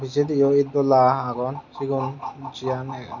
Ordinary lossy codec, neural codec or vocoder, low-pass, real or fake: none; none; 7.2 kHz; real